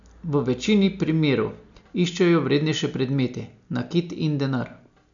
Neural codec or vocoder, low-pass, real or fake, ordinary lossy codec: none; 7.2 kHz; real; none